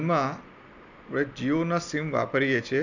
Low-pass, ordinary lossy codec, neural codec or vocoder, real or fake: 7.2 kHz; none; none; real